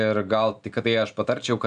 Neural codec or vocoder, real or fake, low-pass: none; real; 9.9 kHz